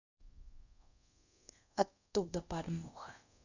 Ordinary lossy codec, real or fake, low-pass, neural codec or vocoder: none; fake; 7.2 kHz; codec, 24 kHz, 0.5 kbps, DualCodec